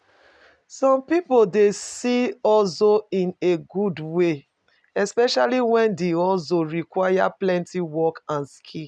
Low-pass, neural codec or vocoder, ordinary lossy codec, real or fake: 9.9 kHz; none; none; real